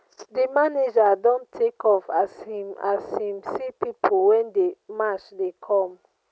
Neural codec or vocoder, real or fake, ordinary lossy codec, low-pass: none; real; none; none